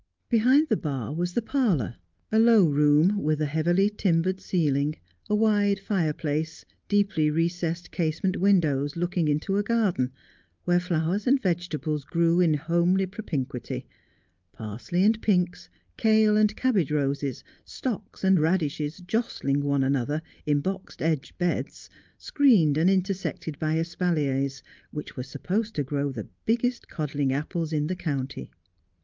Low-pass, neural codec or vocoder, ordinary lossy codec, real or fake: 7.2 kHz; none; Opus, 24 kbps; real